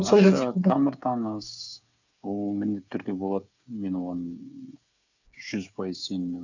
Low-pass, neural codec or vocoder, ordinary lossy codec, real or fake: 7.2 kHz; codec, 16 kHz, 8 kbps, FreqCodec, smaller model; none; fake